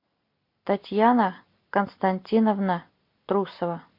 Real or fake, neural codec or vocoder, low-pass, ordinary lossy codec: real; none; 5.4 kHz; MP3, 32 kbps